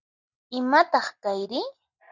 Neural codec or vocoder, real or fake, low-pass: none; real; 7.2 kHz